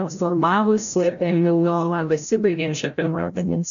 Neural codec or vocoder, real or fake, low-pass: codec, 16 kHz, 0.5 kbps, FreqCodec, larger model; fake; 7.2 kHz